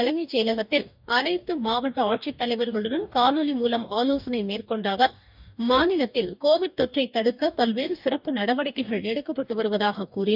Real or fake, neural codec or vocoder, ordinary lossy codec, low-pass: fake; codec, 44.1 kHz, 2.6 kbps, DAC; none; 5.4 kHz